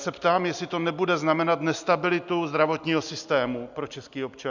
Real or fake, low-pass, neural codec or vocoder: real; 7.2 kHz; none